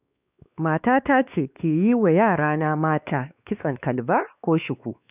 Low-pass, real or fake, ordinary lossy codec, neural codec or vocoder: 3.6 kHz; fake; none; codec, 16 kHz, 2 kbps, X-Codec, WavLM features, trained on Multilingual LibriSpeech